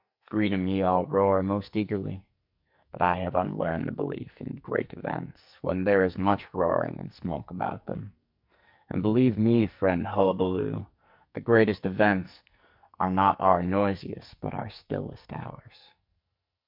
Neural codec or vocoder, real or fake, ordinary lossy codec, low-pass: codec, 44.1 kHz, 2.6 kbps, SNAC; fake; MP3, 48 kbps; 5.4 kHz